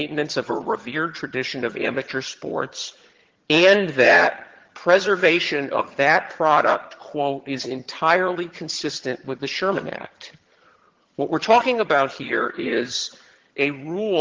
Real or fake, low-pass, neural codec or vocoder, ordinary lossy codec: fake; 7.2 kHz; vocoder, 22.05 kHz, 80 mel bands, HiFi-GAN; Opus, 16 kbps